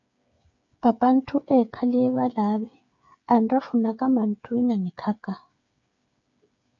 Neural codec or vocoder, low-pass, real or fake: codec, 16 kHz, 8 kbps, FreqCodec, smaller model; 7.2 kHz; fake